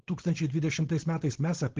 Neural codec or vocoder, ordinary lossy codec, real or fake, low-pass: codec, 16 kHz, 4.8 kbps, FACodec; Opus, 16 kbps; fake; 7.2 kHz